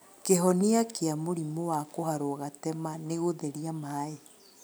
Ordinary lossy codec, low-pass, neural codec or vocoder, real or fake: none; none; none; real